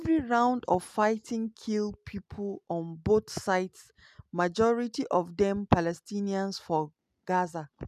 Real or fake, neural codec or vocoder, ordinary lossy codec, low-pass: real; none; none; 14.4 kHz